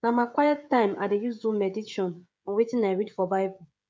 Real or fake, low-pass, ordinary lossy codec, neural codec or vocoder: fake; none; none; codec, 16 kHz, 16 kbps, FreqCodec, smaller model